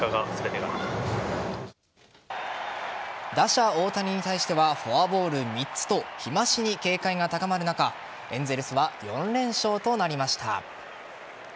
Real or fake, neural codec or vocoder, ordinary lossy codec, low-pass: real; none; none; none